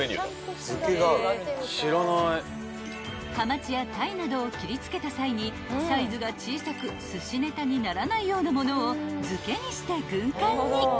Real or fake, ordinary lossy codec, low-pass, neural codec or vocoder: real; none; none; none